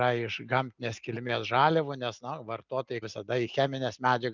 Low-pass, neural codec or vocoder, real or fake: 7.2 kHz; none; real